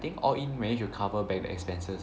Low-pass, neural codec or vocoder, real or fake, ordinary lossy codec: none; none; real; none